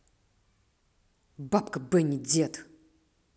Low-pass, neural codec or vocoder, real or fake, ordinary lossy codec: none; none; real; none